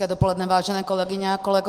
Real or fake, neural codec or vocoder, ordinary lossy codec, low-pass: real; none; Opus, 24 kbps; 14.4 kHz